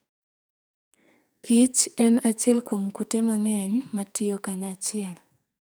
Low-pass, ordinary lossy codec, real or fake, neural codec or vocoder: none; none; fake; codec, 44.1 kHz, 2.6 kbps, SNAC